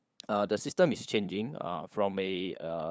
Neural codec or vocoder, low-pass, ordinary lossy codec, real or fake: codec, 16 kHz, 8 kbps, FunCodec, trained on LibriTTS, 25 frames a second; none; none; fake